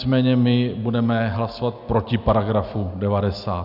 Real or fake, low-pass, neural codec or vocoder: real; 5.4 kHz; none